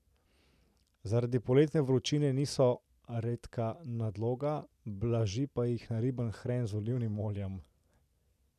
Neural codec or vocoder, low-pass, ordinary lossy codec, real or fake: none; 14.4 kHz; none; real